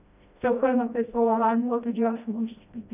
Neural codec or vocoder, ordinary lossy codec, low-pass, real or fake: codec, 16 kHz, 1 kbps, FreqCodec, smaller model; none; 3.6 kHz; fake